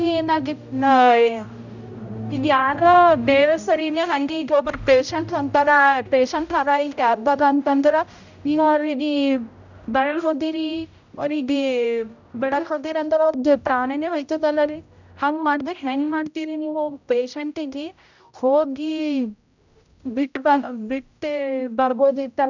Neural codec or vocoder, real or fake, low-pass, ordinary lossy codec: codec, 16 kHz, 0.5 kbps, X-Codec, HuBERT features, trained on general audio; fake; 7.2 kHz; none